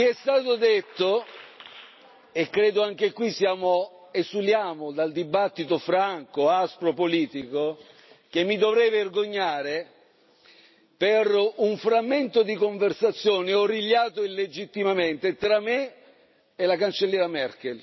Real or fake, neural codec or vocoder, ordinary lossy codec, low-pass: real; none; MP3, 24 kbps; 7.2 kHz